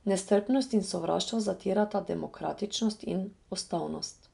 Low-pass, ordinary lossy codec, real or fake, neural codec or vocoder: 10.8 kHz; none; real; none